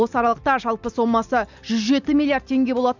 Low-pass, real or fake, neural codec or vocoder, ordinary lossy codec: 7.2 kHz; real; none; none